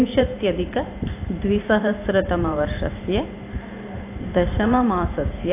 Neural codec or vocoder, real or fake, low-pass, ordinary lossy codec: vocoder, 44.1 kHz, 128 mel bands every 512 samples, BigVGAN v2; fake; 3.6 kHz; AAC, 24 kbps